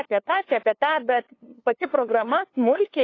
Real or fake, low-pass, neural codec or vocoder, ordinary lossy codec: fake; 7.2 kHz; codec, 16 kHz, 2 kbps, FunCodec, trained on LibriTTS, 25 frames a second; AAC, 32 kbps